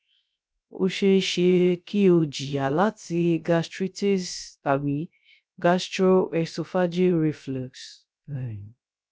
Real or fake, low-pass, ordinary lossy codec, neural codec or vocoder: fake; none; none; codec, 16 kHz, 0.3 kbps, FocalCodec